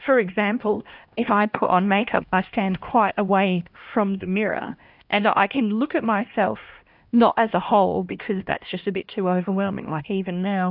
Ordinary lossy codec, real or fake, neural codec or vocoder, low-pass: AAC, 48 kbps; fake; codec, 16 kHz, 1 kbps, X-Codec, HuBERT features, trained on LibriSpeech; 5.4 kHz